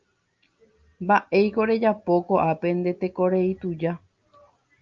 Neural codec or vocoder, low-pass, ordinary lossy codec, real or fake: none; 7.2 kHz; Opus, 24 kbps; real